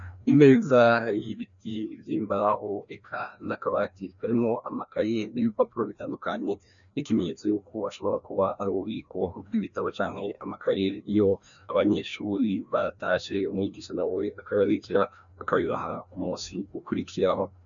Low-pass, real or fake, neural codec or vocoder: 7.2 kHz; fake; codec, 16 kHz, 1 kbps, FreqCodec, larger model